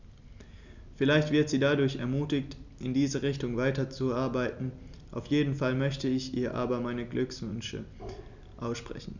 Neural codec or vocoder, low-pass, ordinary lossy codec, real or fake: none; 7.2 kHz; none; real